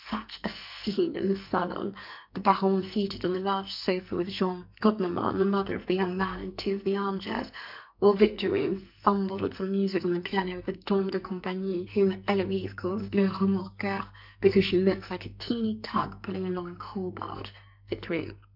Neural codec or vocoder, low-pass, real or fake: codec, 44.1 kHz, 2.6 kbps, SNAC; 5.4 kHz; fake